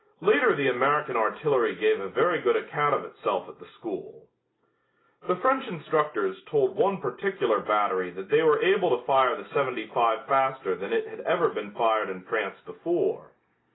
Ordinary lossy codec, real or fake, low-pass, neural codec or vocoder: AAC, 16 kbps; real; 7.2 kHz; none